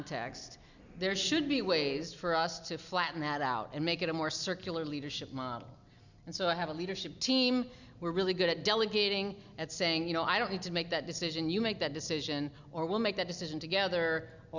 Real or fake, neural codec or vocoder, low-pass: real; none; 7.2 kHz